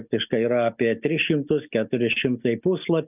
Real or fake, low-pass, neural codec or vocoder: real; 3.6 kHz; none